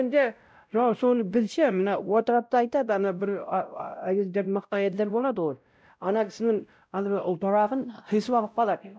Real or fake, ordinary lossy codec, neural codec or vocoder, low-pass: fake; none; codec, 16 kHz, 0.5 kbps, X-Codec, WavLM features, trained on Multilingual LibriSpeech; none